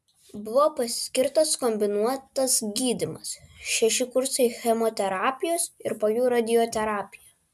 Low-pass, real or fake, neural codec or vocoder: 14.4 kHz; real; none